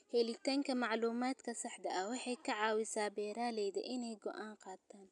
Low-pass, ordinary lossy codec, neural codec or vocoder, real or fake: 10.8 kHz; none; none; real